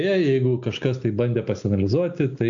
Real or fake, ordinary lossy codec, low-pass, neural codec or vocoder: real; AAC, 64 kbps; 7.2 kHz; none